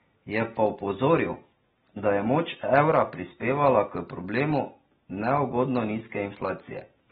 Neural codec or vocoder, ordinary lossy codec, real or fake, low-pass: none; AAC, 16 kbps; real; 19.8 kHz